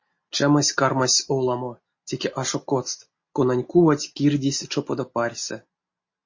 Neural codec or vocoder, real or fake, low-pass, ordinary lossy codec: none; real; 7.2 kHz; MP3, 32 kbps